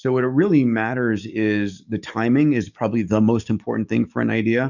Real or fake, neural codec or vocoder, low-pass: fake; codec, 44.1 kHz, 7.8 kbps, DAC; 7.2 kHz